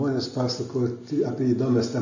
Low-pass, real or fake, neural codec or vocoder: 7.2 kHz; real; none